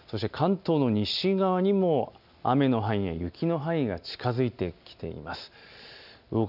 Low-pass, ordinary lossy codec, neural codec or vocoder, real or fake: 5.4 kHz; none; none; real